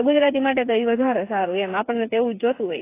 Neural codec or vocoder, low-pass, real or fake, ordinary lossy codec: codec, 16 kHz, 8 kbps, FreqCodec, smaller model; 3.6 kHz; fake; AAC, 24 kbps